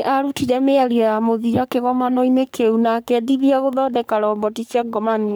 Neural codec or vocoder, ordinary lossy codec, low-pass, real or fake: codec, 44.1 kHz, 3.4 kbps, Pupu-Codec; none; none; fake